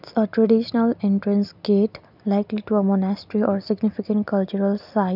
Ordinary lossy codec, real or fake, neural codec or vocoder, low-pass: none; real; none; 5.4 kHz